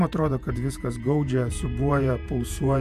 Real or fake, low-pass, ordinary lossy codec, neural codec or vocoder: fake; 14.4 kHz; AAC, 96 kbps; vocoder, 48 kHz, 128 mel bands, Vocos